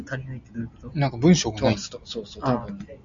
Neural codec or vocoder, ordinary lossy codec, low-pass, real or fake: none; MP3, 64 kbps; 7.2 kHz; real